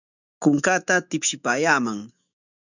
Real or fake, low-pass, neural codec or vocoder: fake; 7.2 kHz; autoencoder, 48 kHz, 128 numbers a frame, DAC-VAE, trained on Japanese speech